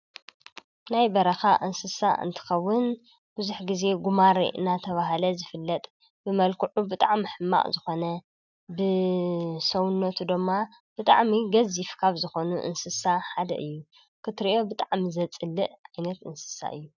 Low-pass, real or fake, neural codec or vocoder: 7.2 kHz; real; none